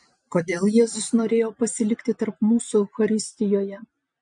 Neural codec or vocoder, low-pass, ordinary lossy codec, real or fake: none; 9.9 kHz; MP3, 48 kbps; real